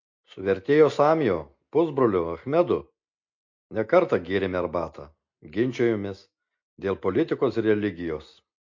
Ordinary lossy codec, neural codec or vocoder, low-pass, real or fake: MP3, 48 kbps; none; 7.2 kHz; real